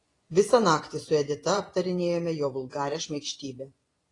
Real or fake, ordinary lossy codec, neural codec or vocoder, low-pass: fake; AAC, 32 kbps; vocoder, 24 kHz, 100 mel bands, Vocos; 10.8 kHz